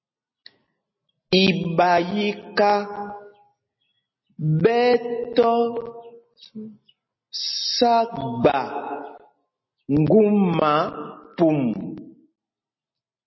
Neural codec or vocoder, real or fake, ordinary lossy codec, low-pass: none; real; MP3, 24 kbps; 7.2 kHz